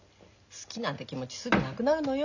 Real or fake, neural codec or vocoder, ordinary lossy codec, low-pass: real; none; none; 7.2 kHz